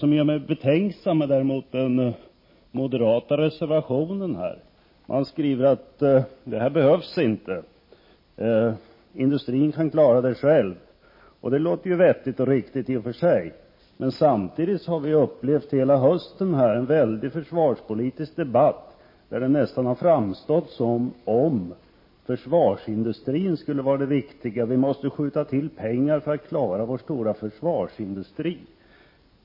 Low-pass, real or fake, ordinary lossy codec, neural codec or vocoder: 5.4 kHz; real; MP3, 24 kbps; none